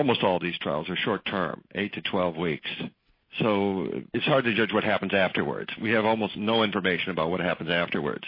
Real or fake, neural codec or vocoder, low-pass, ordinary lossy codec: real; none; 5.4 kHz; MP3, 24 kbps